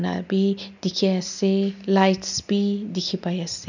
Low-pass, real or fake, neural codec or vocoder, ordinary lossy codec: 7.2 kHz; real; none; none